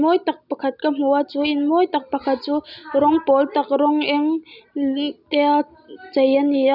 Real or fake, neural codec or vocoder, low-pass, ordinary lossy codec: real; none; 5.4 kHz; none